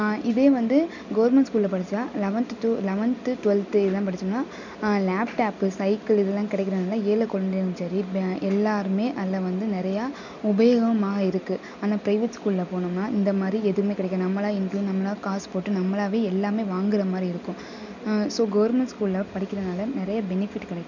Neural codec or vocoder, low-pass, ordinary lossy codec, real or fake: none; 7.2 kHz; none; real